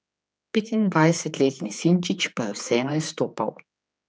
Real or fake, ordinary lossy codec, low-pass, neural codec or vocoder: fake; none; none; codec, 16 kHz, 4 kbps, X-Codec, HuBERT features, trained on general audio